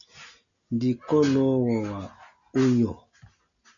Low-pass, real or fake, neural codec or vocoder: 7.2 kHz; real; none